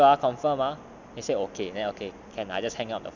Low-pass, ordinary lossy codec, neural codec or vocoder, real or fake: 7.2 kHz; none; none; real